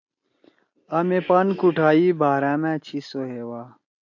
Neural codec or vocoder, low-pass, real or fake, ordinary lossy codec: none; 7.2 kHz; real; AAC, 48 kbps